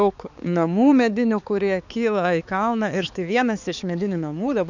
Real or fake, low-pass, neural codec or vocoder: fake; 7.2 kHz; codec, 16 kHz, 4 kbps, X-Codec, HuBERT features, trained on balanced general audio